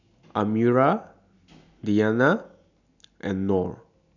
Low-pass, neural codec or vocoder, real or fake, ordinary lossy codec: 7.2 kHz; none; real; none